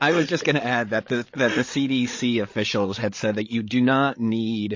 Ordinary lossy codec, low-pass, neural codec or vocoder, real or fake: MP3, 32 kbps; 7.2 kHz; codec, 16 kHz, 16 kbps, FreqCodec, larger model; fake